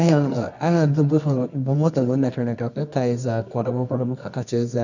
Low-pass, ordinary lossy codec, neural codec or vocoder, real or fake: 7.2 kHz; none; codec, 24 kHz, 0.9 kbps, WavTokenizer, medium music audio release; fake